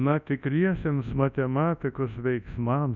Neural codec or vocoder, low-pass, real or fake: codec, 24 kHz, 0.9 kbps, WavTokenizer, large speech release; 7.2 kHz; fake